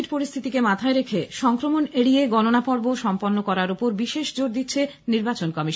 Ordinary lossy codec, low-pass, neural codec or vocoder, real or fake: none; none; none; real